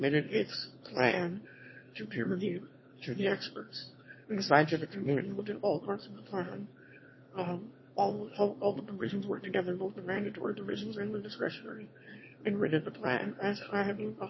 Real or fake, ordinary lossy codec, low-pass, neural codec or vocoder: fake; MP3, 24 kbps; 7.2 kHz; autoencoder, 22.05 kHz, a latent of 192 numbers a frame, VITS, trained on one speaker